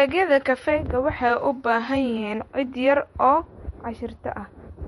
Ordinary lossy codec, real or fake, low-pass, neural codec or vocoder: MP3, 48 kbps; fake; 19.8 kHz; vocoder, 48 kHz, 128 mel bands, Vocos